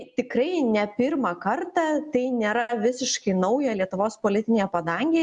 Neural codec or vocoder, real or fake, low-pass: none; real; 10.8 kHz